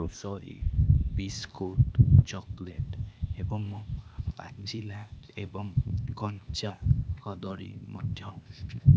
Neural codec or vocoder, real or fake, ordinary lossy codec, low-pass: codec, 16 kHz, 0.8 kbps, ZipCodec; fake; none; none